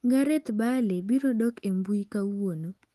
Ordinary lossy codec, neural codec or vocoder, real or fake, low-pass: Opus, 24 kbps; autoencoder, 48 kHz, 128 numbers a frame, DAC-VAE, trained on Japanese speech; fake; 14.4 kHz